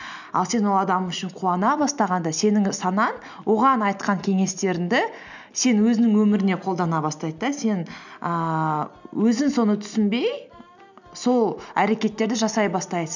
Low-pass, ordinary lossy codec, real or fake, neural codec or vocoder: 7.2 kHz; none; real; none